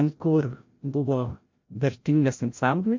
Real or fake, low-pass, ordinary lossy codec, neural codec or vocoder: fake; 7.2 kHz; MP3, 48 kbps; codec, 16 kHz, 0.5 kbps, FreqCodec, larger model